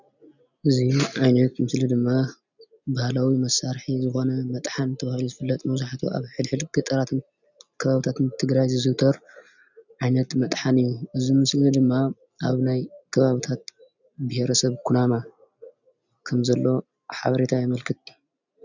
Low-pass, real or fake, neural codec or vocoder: 7.2 kHz; real; none